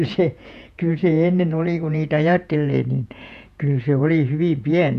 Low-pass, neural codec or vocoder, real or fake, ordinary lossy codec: 14.4 kHz; vocoder, 48 kHz, 128 mel bands, Vocos; fake; none